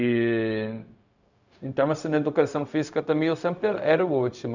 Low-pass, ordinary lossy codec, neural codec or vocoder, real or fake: 7.2 kHz; none; codec, 16 kHz, 0.4 kbps, LongCat-Audio-Codec; fake